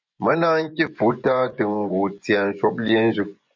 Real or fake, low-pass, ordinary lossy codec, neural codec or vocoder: fake; 7.2 kHz; MP3, 64 kbps; vocoder, 24 kHz, 100 mel bands, Vocos